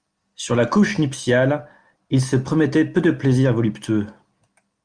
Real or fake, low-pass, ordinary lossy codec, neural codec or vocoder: real; 9.9 kHz; Opus, 32 kbps; none